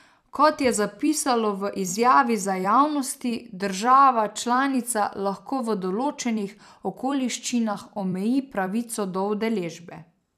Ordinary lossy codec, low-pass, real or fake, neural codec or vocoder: none; 14.4 kHz; fake; vocoder, 44.1 kHz, 128 mel bands every 256 samples, BigVGAN v2